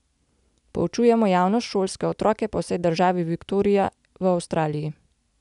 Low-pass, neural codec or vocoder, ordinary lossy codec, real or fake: 10.8 kHz; none; none; real